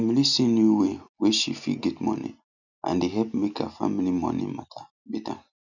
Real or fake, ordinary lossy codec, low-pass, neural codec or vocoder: real; none; 7.2 kHz; none